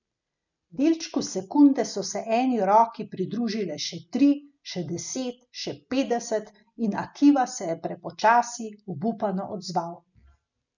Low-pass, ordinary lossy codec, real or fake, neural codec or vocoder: 7.2 kHz; none; real; none